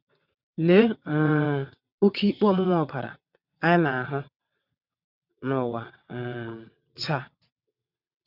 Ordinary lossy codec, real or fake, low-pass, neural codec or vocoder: none; fake; 5.4 kHz; vocoder, 22.05 kHz, 80 mel bands, WaveNeXt